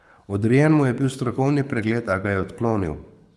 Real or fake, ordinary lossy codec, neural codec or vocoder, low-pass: fake; none; codec, 24 kHz, 6 kbps, HILCodec; none